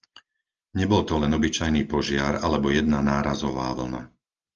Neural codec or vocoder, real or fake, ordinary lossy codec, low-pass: none; real; Opus, 24 kbps; 7.2 kHz